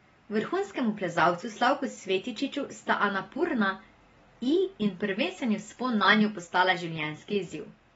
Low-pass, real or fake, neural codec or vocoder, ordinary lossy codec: 10.8 kHz; real; none; AAC, 24 kbps